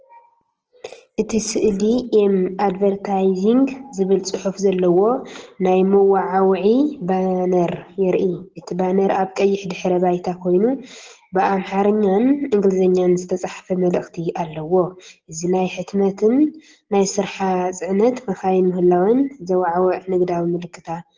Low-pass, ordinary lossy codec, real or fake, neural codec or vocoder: 7.2 kHz; Opus, 16 kbps; real; none